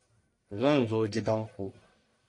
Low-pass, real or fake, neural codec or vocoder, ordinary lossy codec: 10.8 kHz; fake; codec, 44.1 kHz, 1.7 kbps, Pupu-Codec; AAC, 48 kbps